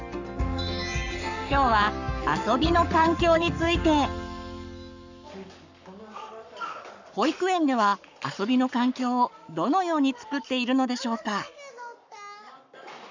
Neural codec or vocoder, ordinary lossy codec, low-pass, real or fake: codec, 44.1 kHz, 7.8 kbps, Pupu-Codec; none; 7.2 kHz; fake